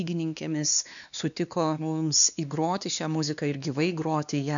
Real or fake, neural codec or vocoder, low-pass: fake; codec, 16 kHz, 2 kbps, X-Codec, WavLM features, trained on Multilingual LibriSpeech; 7.2 kHz